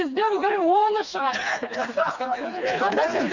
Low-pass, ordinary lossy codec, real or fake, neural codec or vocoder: 7.2 kHz; none; fake; codec, 16 kHz, 2 kbps, FreqCodec, smaller model